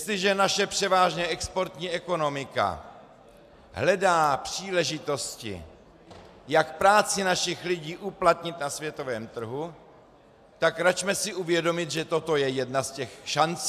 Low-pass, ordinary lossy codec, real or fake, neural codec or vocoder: 14.4 kHz; AAC, 96 kbps; real; none